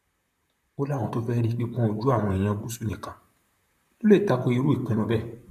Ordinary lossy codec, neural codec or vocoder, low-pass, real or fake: none; vocoder, 44.1 kHz, 128 mel bands, Pupu-Vocoder; 14.4 kHz; fake